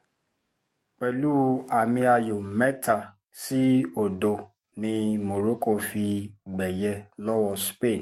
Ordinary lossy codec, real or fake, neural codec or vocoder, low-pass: MP3, 64 kbps; fake; codec, 44.1 kHz, 7.8 kbps, DAC; 19.8 kHz